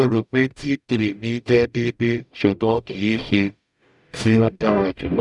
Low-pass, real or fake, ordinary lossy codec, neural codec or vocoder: 10.8 kHz; fake; none; codec, 44.1 kHz, 0.9 kbps, DAC